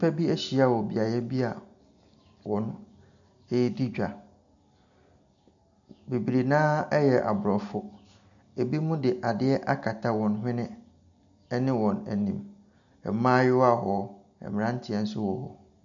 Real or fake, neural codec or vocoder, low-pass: real; none; 7.2 kHz